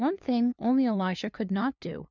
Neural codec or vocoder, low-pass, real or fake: codec, 16 kHz, 2 kbps, FunCodec, trained on LibriTTS, 25 frames a second; 7.2 kHz; fake